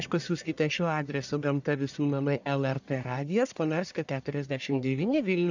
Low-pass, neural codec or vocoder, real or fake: 7.2 kHz; codec, 44.1 kHz, 1.7 kbps, Pupu-Codec; fake